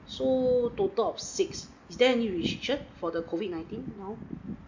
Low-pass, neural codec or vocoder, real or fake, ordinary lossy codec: 7.2 kHz; none; real; MP3, 64 kbps